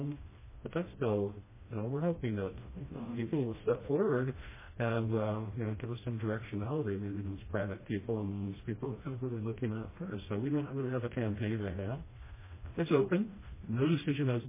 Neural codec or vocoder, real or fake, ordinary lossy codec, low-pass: codec, 16 kHz, 1 kbps, FreqCodec, smaller model; fake; MP3, 16 kbps; 3.6 kHz